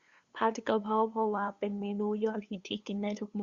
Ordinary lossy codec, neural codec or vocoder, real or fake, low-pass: Opus, 64 kbps; codec, 16 kHz, 2 kbps, FunCodec, trained on LibriTTS, 25 frames a second; fake; 7.2 kHz